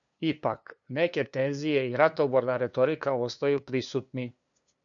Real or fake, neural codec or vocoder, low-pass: fake; codec, 16 kHz, 2 kbps, FunCodec, trained on LibriTTS, 25 frames a second; 7.2 kHz